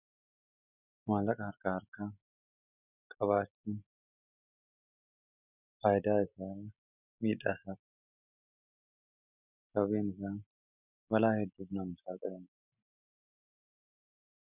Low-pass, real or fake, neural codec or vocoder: 3.6 kHz; real; none